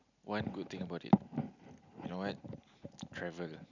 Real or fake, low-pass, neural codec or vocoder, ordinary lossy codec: real; 7.2 kHz; none; none